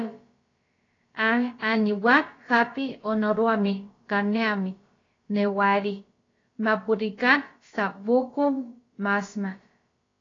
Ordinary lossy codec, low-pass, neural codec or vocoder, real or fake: AAC, 32 kbps; 7.2 kHz; codec, 16 kHz, about 1 kbps, DyCAST, with the encoder's durations; fake